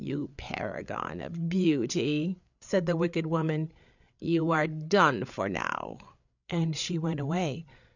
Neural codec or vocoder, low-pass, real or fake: codec, 16 kHz, 8 kbps, FreqCodec, larger model; 7.2 kHz; fake